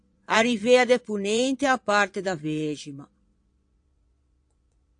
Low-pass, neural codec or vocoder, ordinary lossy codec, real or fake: 9.9 kHz; vocoder, 22.05 kHz, 80 mel bands, Vocos; AAC, 64 kbps; fake